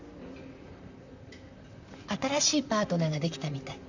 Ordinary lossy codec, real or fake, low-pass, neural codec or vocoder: none; fake; 7.2 kHz; vocoder, 44.1 kHz, 128 mel bands, Pupu-Vocoder